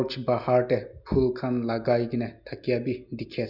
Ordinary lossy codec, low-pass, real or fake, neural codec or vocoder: none; 5.4 kHz; real; none